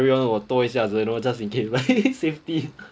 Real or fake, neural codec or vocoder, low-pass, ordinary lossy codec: real; none; none; none